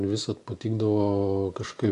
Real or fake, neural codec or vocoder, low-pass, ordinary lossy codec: real; none; 10.8 kHz; AAC, 48 kbps